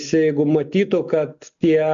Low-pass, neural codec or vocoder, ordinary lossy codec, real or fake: 7.2 kHz; none; MP3, 64 kbps; real